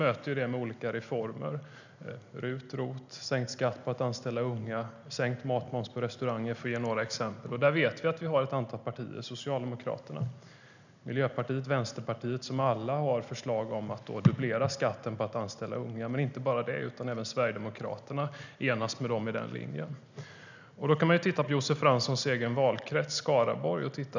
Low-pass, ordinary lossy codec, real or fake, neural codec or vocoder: 7.2 kHz; none; real; none